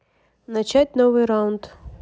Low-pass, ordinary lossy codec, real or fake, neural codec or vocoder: none; none; real; none